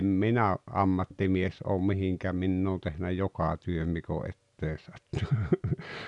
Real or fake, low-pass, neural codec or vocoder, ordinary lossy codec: real; 10.8 kHz; none; none